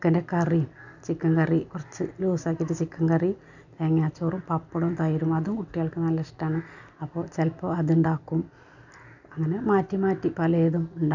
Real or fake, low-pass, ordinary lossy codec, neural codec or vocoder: real; 7.2 kHz; none; none